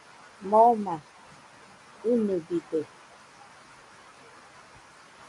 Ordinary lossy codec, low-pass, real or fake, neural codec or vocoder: AAC, 48 kbps; 10.8 kHz; real; none